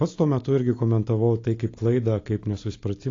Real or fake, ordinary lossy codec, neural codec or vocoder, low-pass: real; AAC, 32 kbps; none; 7.2 kHz